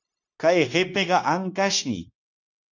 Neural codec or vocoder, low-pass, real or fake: codec, 16 kHz, 0.9 kbps, LongCat-Audio-Codec; 7.2 kHz; fake